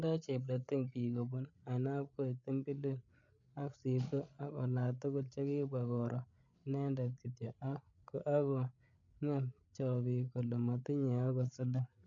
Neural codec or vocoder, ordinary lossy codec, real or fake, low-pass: codec, 16 kHz, 8 kbps, FreqCodec, larger model; none; fake; 7.2 kHz